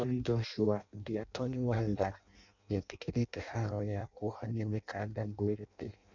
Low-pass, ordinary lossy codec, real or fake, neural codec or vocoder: 7.2 kHz; none; fake; codec, 16 kHz in and 24 kHz out, 0.6 kbps, FireRedTTS-2 codec